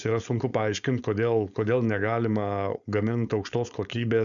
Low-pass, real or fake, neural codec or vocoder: 7.2 kHz; fake; codec, 16 kHz, 4.8 kbps, FACodec